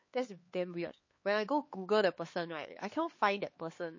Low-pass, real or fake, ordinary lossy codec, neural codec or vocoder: 7.2 kHz; fake; MP3, 32 kbps; codec, 16 kHz, 4 kbps, X-Codec, HuBERT features, trained on LibriSpeech